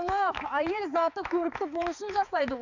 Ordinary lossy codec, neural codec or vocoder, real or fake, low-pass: none; codec, 16 kHz, 4 kbps, X-Codec, HuBERT features, trained on balanced general audio; fake; 7.2 kHz